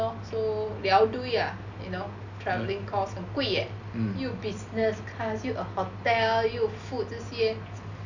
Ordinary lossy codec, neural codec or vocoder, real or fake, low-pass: none; none; real; 7.2 kHz